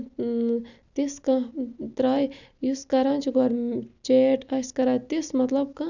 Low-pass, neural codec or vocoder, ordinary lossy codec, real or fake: 7.2 kHz; none; none; real